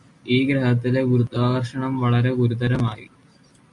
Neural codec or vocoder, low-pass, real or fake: none; 10.8 kHz; real